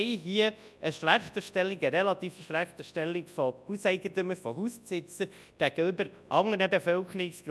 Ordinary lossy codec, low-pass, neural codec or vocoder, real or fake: none; none; codec, 24 kHz, 0.9 kbps, WavTokenizer, large speech release; fake